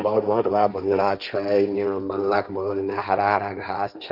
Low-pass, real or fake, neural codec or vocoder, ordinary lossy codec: 5.4 kHz; fake; codec, 16 kHz, 1.1 kbps, Voila-Tokenizer; none